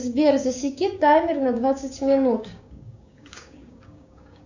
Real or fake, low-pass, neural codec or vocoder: fake; 7.2 kHz; codec, 16 kHz, 6 kbps, DAC